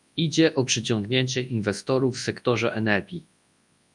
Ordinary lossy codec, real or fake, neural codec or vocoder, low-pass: MP3, 96 kbps; fake; codec, 24 kHz, 0.9 kbps, WavTokenizer, large speech release; 10.8 kHz